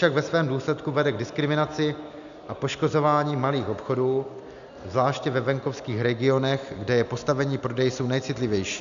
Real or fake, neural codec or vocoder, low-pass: real; none; 7.2 kHz